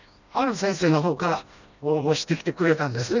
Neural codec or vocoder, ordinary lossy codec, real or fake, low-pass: codec, 16 kHz, 1 kbps, FreqCodec, smaller model; none; fake; 7.2 kHz